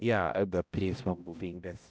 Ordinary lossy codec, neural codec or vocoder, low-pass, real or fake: none; codec, 16 kHz, 0.5 kbps, X-Codec, HuBERT features, trained on balanced general audio; none; fake